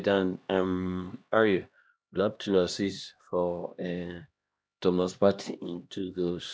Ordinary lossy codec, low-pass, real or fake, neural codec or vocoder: none; none; fake; codec, 16 kHz, 1 kbps, X-Codec, HuBERT features, trained on LibriSpeech